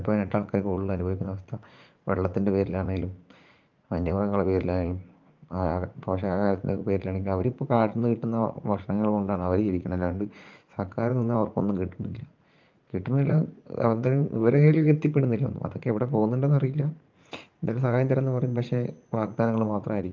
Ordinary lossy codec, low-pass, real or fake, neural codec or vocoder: Opus, 24 kbps; 7.2 kHz; fake; vocoder, 44.1 kHz, 80 mel bands, Vocos